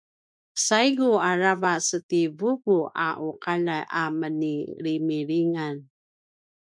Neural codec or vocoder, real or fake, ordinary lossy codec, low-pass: autoencoder, 48 kHz, 128 numbers a frame, DAC-VAE, trained on Japanese speech; fake; MP3, 96 kbps; 9.9 kHz